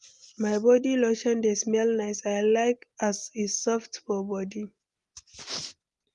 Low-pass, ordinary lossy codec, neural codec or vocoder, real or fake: 10.8 kHz; Opus, 24 kbps; none; real